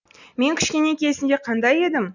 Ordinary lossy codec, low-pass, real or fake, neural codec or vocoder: none; 7.2 kHz; real; none